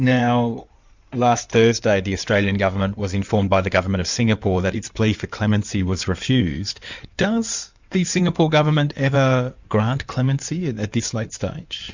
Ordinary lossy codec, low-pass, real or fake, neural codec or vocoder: Opus, 64 kbps; 7.2 kHz; fake; codec, 16 kHz in and 24 kHz out, 2.2 kbps, FireRedTTS-2 codec